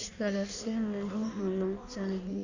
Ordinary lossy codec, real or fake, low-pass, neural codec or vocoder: none; fake; 7.2 kHz; codec, 16 kHz in and 24 kHz out, 1.1 kbps, FireRedTTS-2 codec